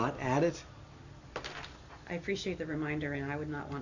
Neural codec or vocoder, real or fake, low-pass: none; real; 7.2 kHz